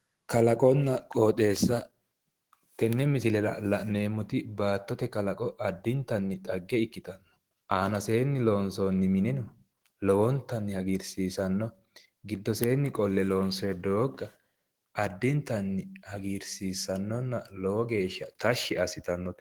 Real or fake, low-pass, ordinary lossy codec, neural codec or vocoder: fake; 19.8 kHz; Opus, 16 kbps; autoencoder, 48 kHz, 128 numbers a frame, DAC-VAE, trained on Japanese speech